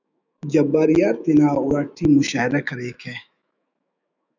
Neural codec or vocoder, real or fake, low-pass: autoencoder, 48 kHz, 128 numbers a frame, DAC-VAE, trained on Japanese speech; fake; 7.2 kHz